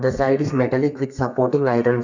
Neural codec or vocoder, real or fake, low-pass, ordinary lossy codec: codec, 32 kHz, 1.9 kbps, SNAC; fake; 7.2 kHz; none